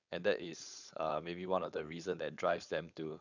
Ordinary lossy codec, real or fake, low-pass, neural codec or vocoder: none; fake; 7.2 kHz; codec, 16 kHz, 4.8 kbps, FACodec